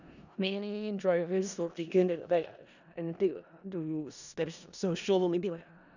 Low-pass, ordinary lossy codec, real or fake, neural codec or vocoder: 7.2 kHz; none; fake; codec, 16 kHz in and 24 kHz out, 0.4 kbps, LongCat-Audio-Codec, four codebook decoder